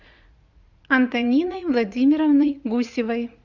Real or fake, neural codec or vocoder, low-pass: fake; vocoder, 44.1 kHz, 80 mel bands, Vocos; 7.2 kHz